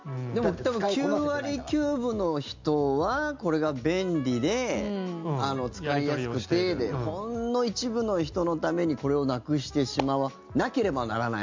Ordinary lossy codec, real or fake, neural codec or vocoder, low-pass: MP3, 64 kbps; real; none; 7.2 kHz